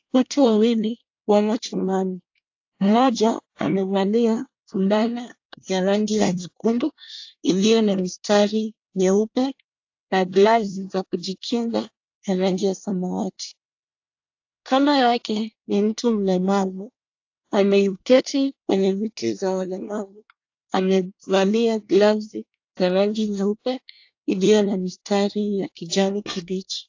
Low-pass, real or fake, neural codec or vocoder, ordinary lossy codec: 7.2 kHz; fake; codec, 24 kHz, 1 kbps, SNAC; AAC, 48 kbps